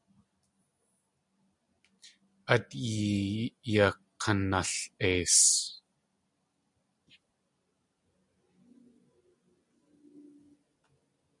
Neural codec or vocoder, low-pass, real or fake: none; 10.8 kHz; real